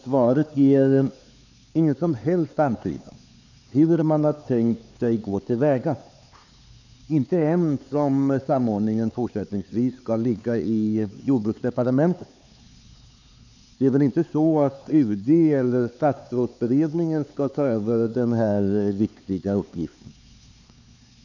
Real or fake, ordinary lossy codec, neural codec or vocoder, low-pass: fake; none; codec, 16 kHz, 4 kbps, X-Codec, HuBERT features, trained on LibriSpeech; 7.2 kHz